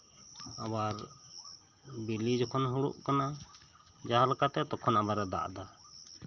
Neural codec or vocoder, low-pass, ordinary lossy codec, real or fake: none; 7.2 kHz; Opus, 32 kbps; real